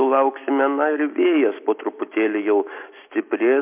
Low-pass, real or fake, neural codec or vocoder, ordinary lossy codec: 3.6 kHz; real; none; MP3, 32 kbps